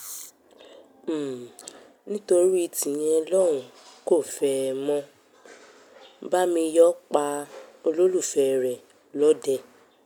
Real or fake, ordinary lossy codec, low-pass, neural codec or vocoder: real; none; none; none